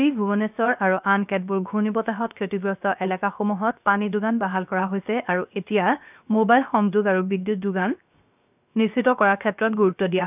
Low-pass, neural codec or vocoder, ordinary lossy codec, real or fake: 3.6 kHz; codec, 16 kHz, 0.7 kbps, FocalCodec; none; fake